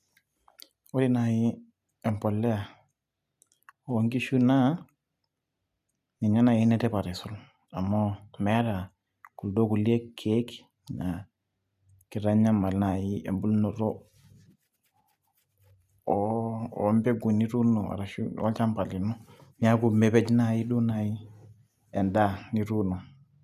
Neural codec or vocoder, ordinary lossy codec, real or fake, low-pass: none; none; real; 14.4 kHz